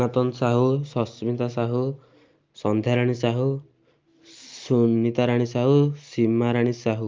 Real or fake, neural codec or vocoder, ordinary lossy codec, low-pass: real; none; Opus, 32 kbps; 7.2 kHz